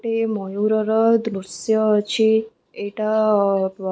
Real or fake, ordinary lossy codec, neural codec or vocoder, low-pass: real; none; none; none